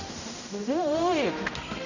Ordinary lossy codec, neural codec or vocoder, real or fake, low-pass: none; codec, 16 kHz, 0.5 kbps, X-Codec, HuBERT features, trained on general audio; fake; 7.2 kHz